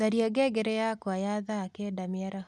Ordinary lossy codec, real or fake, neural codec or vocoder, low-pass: none; real; none; none